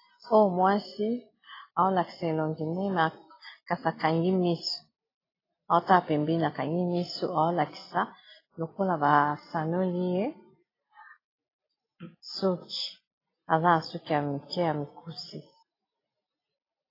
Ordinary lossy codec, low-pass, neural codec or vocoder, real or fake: AAC, 24 kbps; 5.4 kHz; none; real